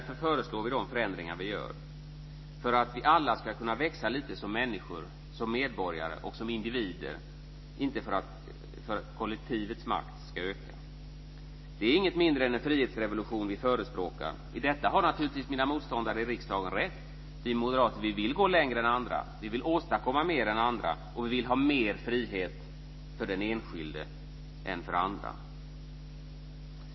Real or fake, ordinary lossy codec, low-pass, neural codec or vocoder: real; MP3, 24 kbps; 7.2 kHz; none